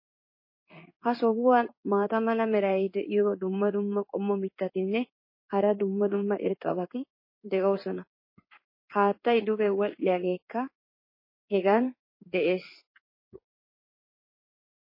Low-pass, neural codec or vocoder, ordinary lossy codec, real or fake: 5.4 kHz; codec, 16 kHz in and 24 kHz out, 1 kbps, XY-Tokenizer; MP3, 24 kbps; fake